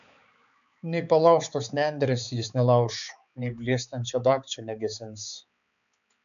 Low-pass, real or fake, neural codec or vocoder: 7.2 kHz; fake; codec, 16 kHz, 4 kbps, X-Codec, HuBERT features, trained on balanced general audio